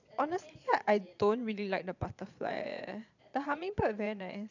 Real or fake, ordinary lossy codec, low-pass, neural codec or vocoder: fake; none; 7.2 kHz; vocoder, 22.05 kHz, 80 mel bands, Vocos